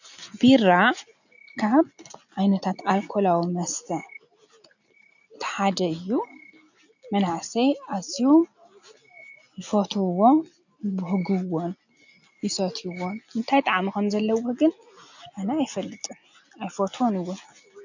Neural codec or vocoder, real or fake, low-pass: none; real; 7.2 kHz